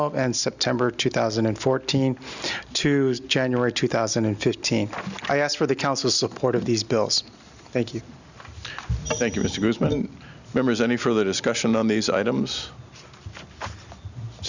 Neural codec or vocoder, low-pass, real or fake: none; 7.2 kHz; real